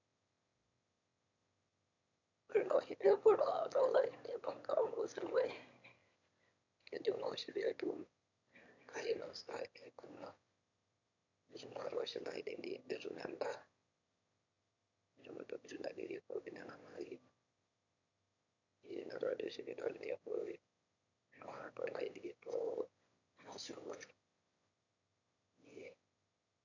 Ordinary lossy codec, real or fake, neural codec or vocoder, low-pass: none; fake; autoencoder, 22.05 kHz, a latent of 192 numbers a frame, VITS, trained on one speaker; 7.2 kHz